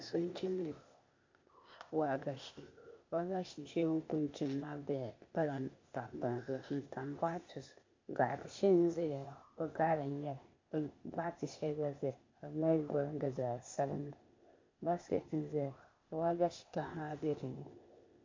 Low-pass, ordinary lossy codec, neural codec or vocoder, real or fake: 7.2 kHz; MP3, 48 kbps; codec, 16 kHz, 0.8 kbps, ZipCodec; fake